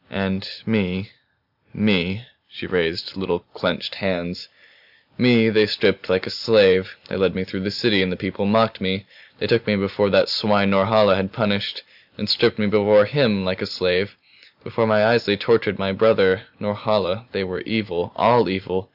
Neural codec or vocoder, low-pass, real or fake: none; 5.4 kHz; real